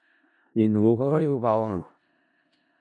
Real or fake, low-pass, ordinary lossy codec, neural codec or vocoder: fake; 10.8 kHz; MP3, 64 kbps; codec, 16 kHz in and 24 kHz out, 0.4 kbps, LongCat-Audio-Codec, four codebook decoder